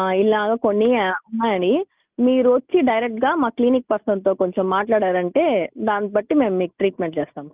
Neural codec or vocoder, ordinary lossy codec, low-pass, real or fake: none; Opus, 24 kbps; 3.6 kHz; real